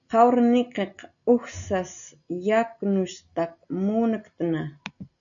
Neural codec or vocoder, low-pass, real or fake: none; 7.2 kHz; real